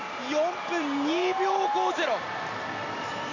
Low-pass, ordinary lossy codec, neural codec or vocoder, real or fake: 7.2 kHz; none; none; real